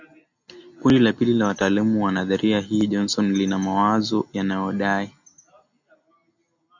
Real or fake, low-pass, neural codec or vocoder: real; 7.2 kHz; none